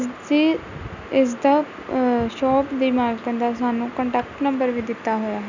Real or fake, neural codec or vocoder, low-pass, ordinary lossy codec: real; none; 7.2 kHz; none